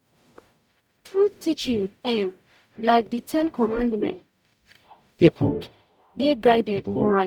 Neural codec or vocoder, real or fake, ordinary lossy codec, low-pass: codec, 44.1 kHz, 0.9 kbps, DAC; fake; none; 19.8 kHz